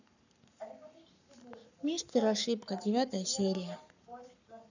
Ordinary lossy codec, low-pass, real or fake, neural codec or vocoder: none; 7.2 kHz; fake; codec, 44.1 kHz, 7.8 kbps, Pupu-Codec